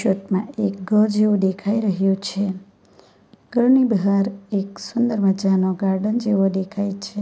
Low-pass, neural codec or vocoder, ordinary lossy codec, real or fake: none; none; none; real